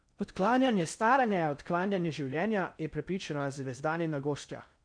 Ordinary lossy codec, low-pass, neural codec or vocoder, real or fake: none; 9.9 kHz; codec, 16 kHz in and 24 kHz out, 0.6 kbps, FocalCodec, streaming, 4096 codes; fake